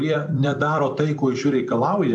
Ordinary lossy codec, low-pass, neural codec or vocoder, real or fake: MP3, 64 kbps; 10.8 kHz; vocoder, 44.1 kHz, 128 mel bands every 256 samples, BigVGAN v2; fake